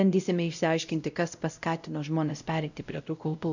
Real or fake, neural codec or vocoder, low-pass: fake; codec, 16 kHz, 0.5 kbps, X-Codec, WavLM features, trained on Multilingual LibriSpeech; 7.2 kHz